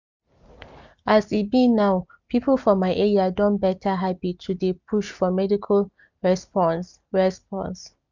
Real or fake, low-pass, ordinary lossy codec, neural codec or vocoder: real; 7.2 kHz; none; none